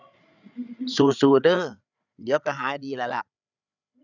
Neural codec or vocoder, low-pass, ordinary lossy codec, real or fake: codec, 16 kHz, 8 kbps, FreqCodec, larger model; 7.2 kHz; none; fake